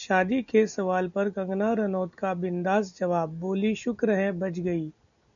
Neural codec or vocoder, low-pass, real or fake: none; 7.2 kHz; real